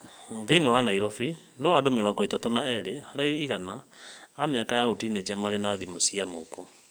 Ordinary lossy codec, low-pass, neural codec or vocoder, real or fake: none; none; codec, 44.1 kHz, 2.6 kbps, SNAC; fake